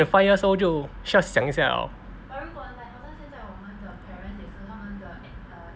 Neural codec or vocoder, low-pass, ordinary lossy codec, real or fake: none; none; none; real